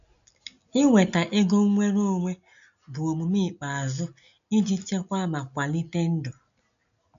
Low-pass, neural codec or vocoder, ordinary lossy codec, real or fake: 7.2 kHz; none; none; real